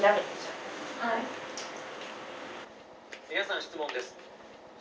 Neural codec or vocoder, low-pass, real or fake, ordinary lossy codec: none; none; real; none